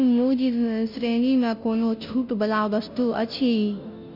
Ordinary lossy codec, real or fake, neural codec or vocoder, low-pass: none; fake; codec, 16 kHz, 0.5 kbps, FunCodec, trained on Chinese and English, 25 frames a second; 5.4 kHz